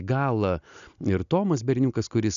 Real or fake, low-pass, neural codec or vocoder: real; 7.2 kHz; none